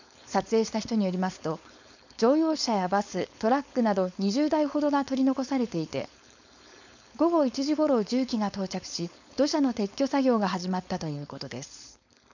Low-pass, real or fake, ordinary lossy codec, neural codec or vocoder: 7.2 kHz; fake; none; codec, 16 kHz, 4.8 kbps, FACodec